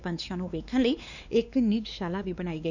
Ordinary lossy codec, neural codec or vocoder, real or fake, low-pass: none; codec, 16 kHz, 2 kbps, X-Codec, WavLM features, trained on Multilingual LibriSpeech; fake; 7.2 kHz